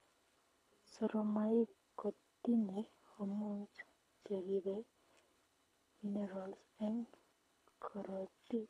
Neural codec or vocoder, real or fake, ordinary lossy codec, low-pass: codec, 24 kHz, 6 kbps, HILCodec; fake; none; none